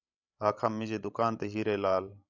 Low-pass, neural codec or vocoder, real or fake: 7.2 kHz; codec, 16 kHz, 16 kbps, FreqCodec, larger model; fake